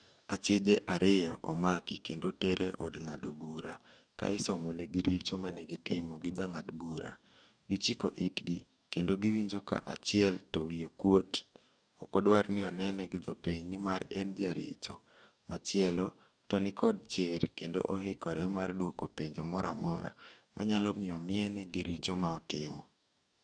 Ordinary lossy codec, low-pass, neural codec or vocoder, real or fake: none; 9.9 kHz; codec, 44.1 kHz, 2.6 kbps, DAC; fake